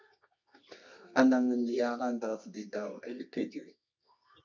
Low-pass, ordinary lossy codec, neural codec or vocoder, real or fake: 7.2 kHz; MP3, 64 kbps; codec, 24 kHz, 0.9 kbps, WavTokenizer, medium music audio release; fake